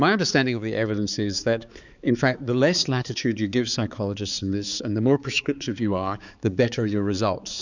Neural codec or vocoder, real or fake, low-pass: codec, 16 kHz, 4 kbps, X-Codec, HuBERT features, trained on balanced general audio; fake; 7.2 kHz